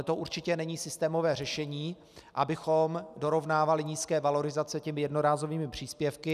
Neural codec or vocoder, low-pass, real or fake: none; 14.4 kHz; real